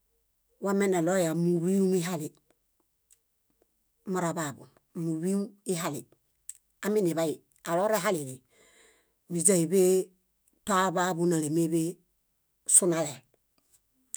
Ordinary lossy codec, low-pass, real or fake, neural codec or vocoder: none; none; fake; autoencoder, 48 kHz, 128 numbers a frame, DAC-VAE, trained on Japanese speech